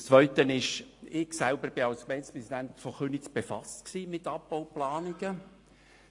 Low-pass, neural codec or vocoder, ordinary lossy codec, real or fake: 10.8 kHz; none; AAC, 48 kbps; real